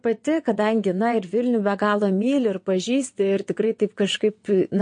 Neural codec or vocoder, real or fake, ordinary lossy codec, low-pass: vocoder, 22.05 kHz, 80 mel bands, WaveNeXt; fake; MP3, 48 kbps; 9.9 kHz